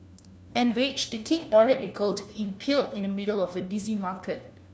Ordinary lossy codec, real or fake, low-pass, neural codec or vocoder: none; fake; none; codec, 16 kHz, 1 kbps, FunCodec, trained on LibriTTS, 50 frames a second